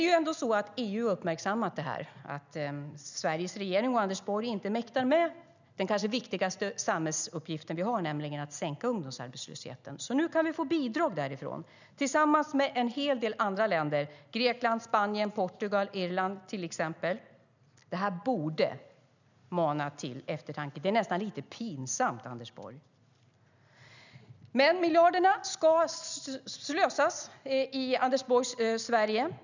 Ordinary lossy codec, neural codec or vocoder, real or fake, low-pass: none; none; real; 7.2 kHz